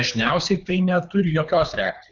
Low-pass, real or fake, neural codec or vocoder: 7.2 kHz; fake; codec, 24 kHz, 3 kbps, HILCodec